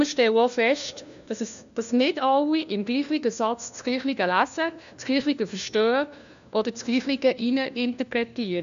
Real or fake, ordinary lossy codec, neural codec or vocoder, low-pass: fake; none; codec, 16 kHz, 1 kbps, FunCodec, trained on LibriTTS, 50 frames a second; 7.2 kHz